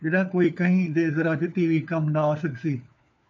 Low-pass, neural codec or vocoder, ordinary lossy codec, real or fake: 7.2 kHz; codec, 16 kHz, 4 kbps, FunCodec, trained on LibriTTS, 50 frames a second; AAC, 48 kbps; fake